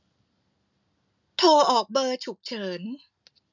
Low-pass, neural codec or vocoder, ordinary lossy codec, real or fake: 7.2 kHz; none; none; real